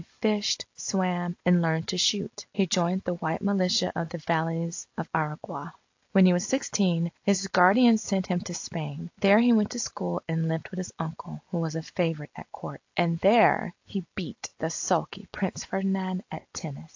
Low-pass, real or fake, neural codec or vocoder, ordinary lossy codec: 7.2 kHz; real; none; AAC, 48 kbps